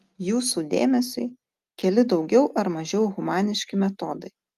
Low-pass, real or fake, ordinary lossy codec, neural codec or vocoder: 14.4 kHz; real; Opus, 32 kbps; none